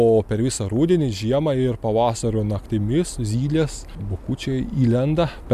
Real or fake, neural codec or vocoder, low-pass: real; none; 14.4 kHz